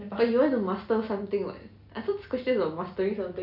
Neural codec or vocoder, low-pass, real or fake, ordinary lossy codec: none; 5.4 kHz; real; none